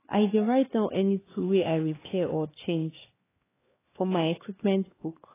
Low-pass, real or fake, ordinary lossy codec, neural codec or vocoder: 3.6 kHz; fake; AAC, 16 kbps; codec, 16 kHz, 2 kbps, X-Codec, HuBERT features, trained on LibriSpeech